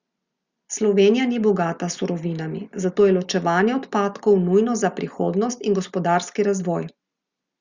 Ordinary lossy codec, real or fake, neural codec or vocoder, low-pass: Opus, 64 kbps; real; none; 7.2 kHz